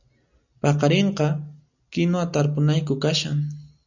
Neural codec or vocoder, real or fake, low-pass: none; real; 7.2 kHz